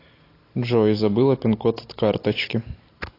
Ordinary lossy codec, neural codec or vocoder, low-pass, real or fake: AAC, 32 kbps; none; 5.4 kHz; real